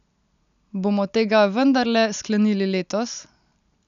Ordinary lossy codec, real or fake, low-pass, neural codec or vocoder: none; real; 7.2 kHz; none